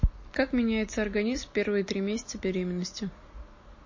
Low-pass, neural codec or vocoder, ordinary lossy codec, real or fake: 7.2 kHz; none; MP3, 32 kbps; real